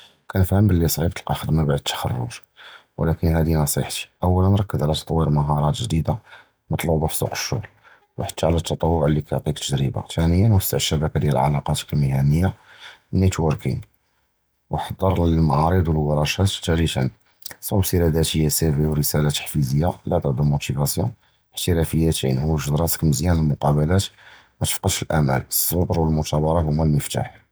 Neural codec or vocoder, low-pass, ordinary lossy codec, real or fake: none; none; none; real